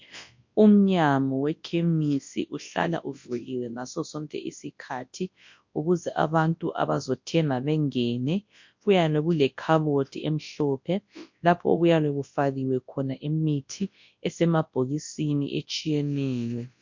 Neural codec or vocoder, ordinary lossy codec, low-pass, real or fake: codec, 24 kHz, 0.9 kbps, WavTokenizer, large speech release; MP3, 48 kbps; 7.2 kHz; fake